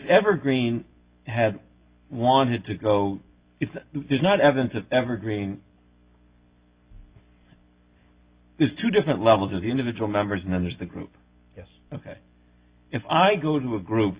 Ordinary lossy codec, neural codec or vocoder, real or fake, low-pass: Opus, 64 kbps; none; real; 3.6 kHz